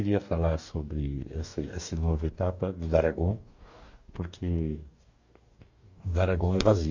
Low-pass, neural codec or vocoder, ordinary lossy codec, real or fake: 7.2 kHz; codec, 44.1 kHz, 2.6 kbps, DAC; Opus, 64 kbps; fake